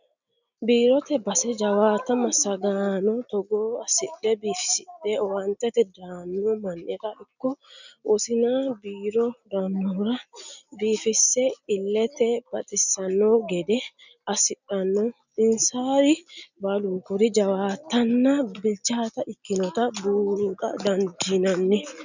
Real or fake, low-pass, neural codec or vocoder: real; 7.2 kHz; none